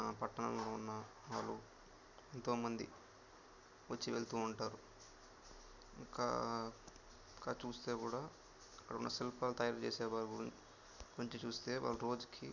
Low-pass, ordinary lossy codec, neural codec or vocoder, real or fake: 7.2 kHz; none; none; real